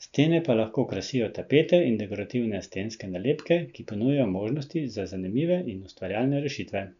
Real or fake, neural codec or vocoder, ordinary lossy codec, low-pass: real; none; none; 7.2 kHz